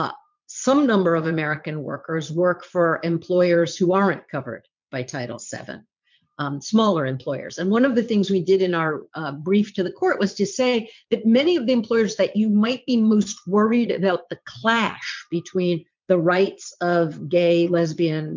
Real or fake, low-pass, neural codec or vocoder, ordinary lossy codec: fake; 7.2 kHz; vocoder, 22.05 kHz, 80 mel bands, Vocos; MP3, 64 kbps